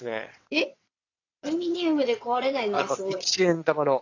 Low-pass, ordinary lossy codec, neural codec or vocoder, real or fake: 7.2 kHz; AAC, 48 kbps; vocoder, 22.05 kHz, 80 mel bands, WaveNeXt; fake